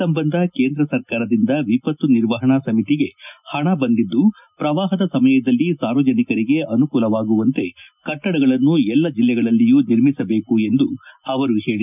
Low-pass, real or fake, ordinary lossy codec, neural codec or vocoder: 3.6 kHz; real; none; none